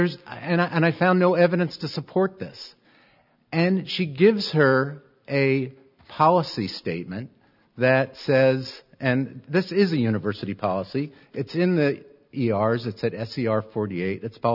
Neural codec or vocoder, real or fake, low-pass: none; real; 5.4 kHz